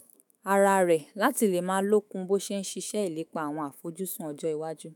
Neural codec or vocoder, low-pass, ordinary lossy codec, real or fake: autoencoder, 48 kHz, 128 numbers a frame, DAC-VAE, trained on Japanese speech; none; none; fake